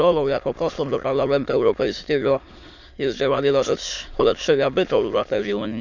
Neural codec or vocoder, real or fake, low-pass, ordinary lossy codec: autoencoder, 22.05 kHz, a latent of 192 numbers a frame, VITS, trained on many speakers; fake; 7.2 kHz; none